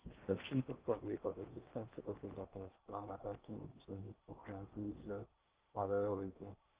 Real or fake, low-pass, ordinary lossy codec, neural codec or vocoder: fake; 3.6 kHz; Opus, 32 kbps; codec, 16 kHz in and 24 kHz out, 0.8 kbps, FocalCodec, streaming, 65536 codes